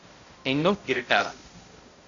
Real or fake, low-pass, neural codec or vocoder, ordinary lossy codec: fake; 7.2 kHz; codec, 16 kHz, 0.5 kbps, X-Codec, HuBERT features, trained on balanced general audio; AAC, 64 kbps